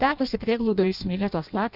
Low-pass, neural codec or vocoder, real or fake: 5.4 kHz; codec, 16 kHz in and 24 kHz out, 0.6 kbps, FireRedTTS-2 codec; fake